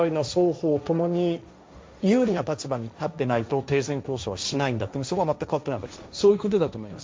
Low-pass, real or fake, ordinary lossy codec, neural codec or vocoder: none; fake; none; codec, 16 kHz, 1.1 kbps, Voila-Tokenizer